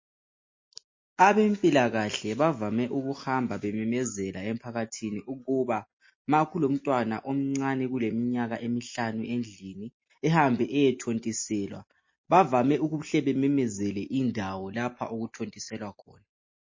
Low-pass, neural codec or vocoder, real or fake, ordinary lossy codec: 7.2 kHz; none; real; MP3, 32 kbps